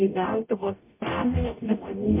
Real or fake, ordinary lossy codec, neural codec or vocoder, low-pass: fake; MP3, 24 kbps; codec, 44.1 kHz, 0.9 kbps, DAC; 3.6 kHz